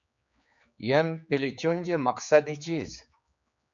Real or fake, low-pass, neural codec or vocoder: fake; 7.2 kHz; codec, 16 kHz, 4 kbps, X-Codec, HuBERT features, trained on general audio